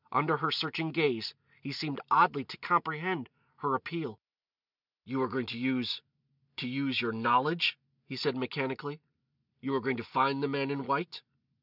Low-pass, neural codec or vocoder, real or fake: 5.4 kHz; none; real